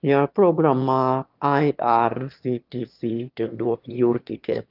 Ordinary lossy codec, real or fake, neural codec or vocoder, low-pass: Opus, 32 kbps; fake; autoencoder, 22.05 kHz, a latent of 192 numbers a frame, VITS, trained on one speaker; 5.4 kHz